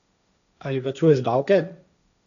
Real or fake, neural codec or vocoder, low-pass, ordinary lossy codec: fake; codec, 16 kHz, 1.1 kbps, Voila-Tokenizer; 7.2 kHz; none